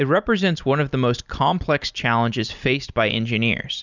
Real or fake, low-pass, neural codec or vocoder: real; 7.2 kHz; none